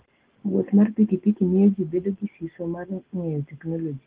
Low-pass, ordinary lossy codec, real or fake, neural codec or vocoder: 3.6 kHz; Opus, 16 kbps; real; none